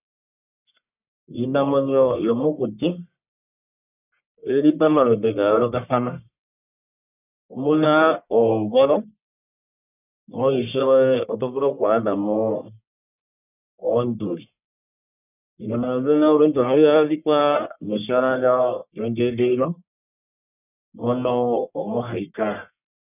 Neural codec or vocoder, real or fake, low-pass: codec, 44.1 kHz, 1.7 kbps, Pupu-Codec; fake; 3.6 kHz